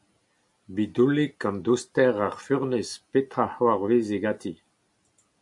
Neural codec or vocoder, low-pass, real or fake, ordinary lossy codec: none; 10.8 kHz; real; MP3, 64 kbps